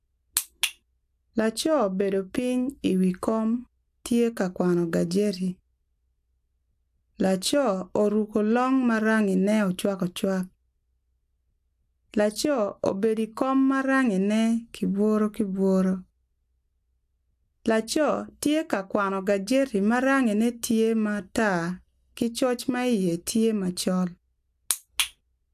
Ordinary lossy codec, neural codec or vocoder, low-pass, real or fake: AAC, 96 kbps; none; 14.4 kHz; real